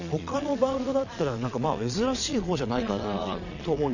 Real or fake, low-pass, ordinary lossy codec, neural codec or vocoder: fake; 7.2 kHz; none; vocoder, 22.05 kHz, 80 mel bands, Vocos